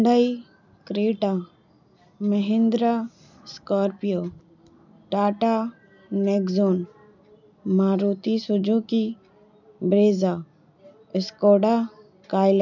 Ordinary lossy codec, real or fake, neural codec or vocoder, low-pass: none; real; none; 7.2 kHz